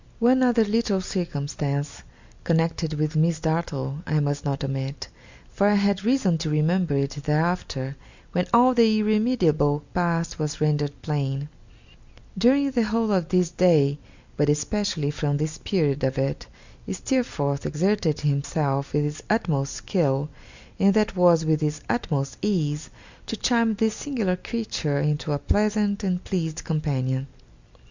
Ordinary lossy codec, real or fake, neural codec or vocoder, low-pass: Opus, 64 kbps; real; none; 7.2 kHz